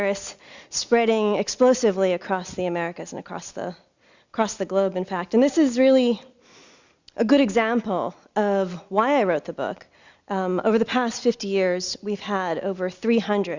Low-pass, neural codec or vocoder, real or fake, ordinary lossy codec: 7.2 kHz; none; real; Opus, 64 kbps